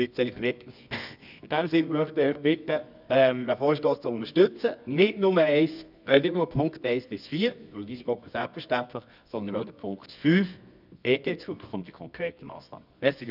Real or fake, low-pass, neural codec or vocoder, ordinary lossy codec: fake; 5.4 kHz; codec, 24 kHz, 0.9 kbps, WavTokenizer, medium music audio release; none